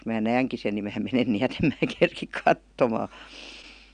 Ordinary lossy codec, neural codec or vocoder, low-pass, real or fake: none; none; 9.9 kHz; real